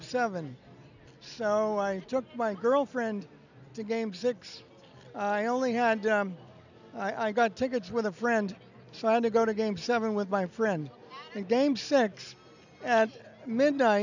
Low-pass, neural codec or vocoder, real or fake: 7.2 kHz; none; real